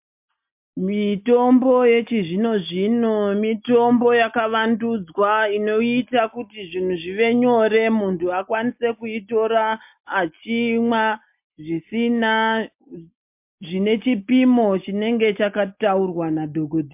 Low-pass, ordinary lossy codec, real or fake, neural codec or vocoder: 3.6 kHz; MP3, 32 kbps; real; none